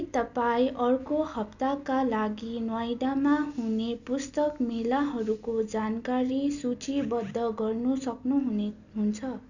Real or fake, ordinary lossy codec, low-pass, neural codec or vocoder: real; none; 7.2 kHz; none